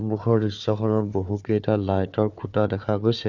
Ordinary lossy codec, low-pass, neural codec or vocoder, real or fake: none; 7.2 kHz; codec, 16 kHz, 4 kbps, FunCodec, trained on Chinese and English, 50 frames a second; fake